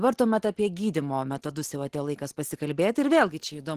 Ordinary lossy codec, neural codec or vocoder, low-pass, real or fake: Opus, 16 kbps; none; 14.4 kHz; real